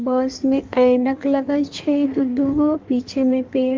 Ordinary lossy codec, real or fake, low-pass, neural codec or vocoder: Opus, 32 kbps; fake; 7.2 kHz; codec, 16 kHz in and 24 kHz out, 1.1 kbps, FireRedTTS-2 codec